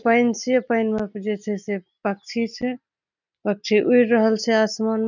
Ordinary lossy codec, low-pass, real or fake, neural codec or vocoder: none; 7.2 kHz; real; none